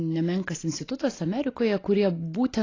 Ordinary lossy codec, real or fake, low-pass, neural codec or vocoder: AAC, 32 kbps; real; 7.2 kHz; none